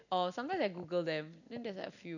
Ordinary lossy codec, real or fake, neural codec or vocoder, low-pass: none; real; none; 7.2 kHz